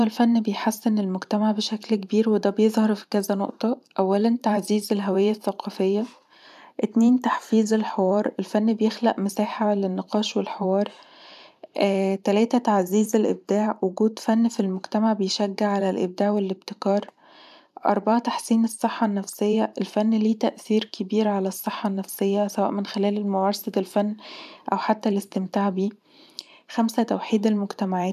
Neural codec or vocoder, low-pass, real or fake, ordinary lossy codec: vocoder, 44.1 kHz, 128 mel bands every 512 samples, BigVGAN v2; 14.4 kHz; fake; none